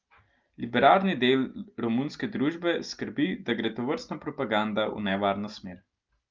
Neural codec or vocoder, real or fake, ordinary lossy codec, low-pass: none; real; Opus, 32 kbps; 7.2 kHz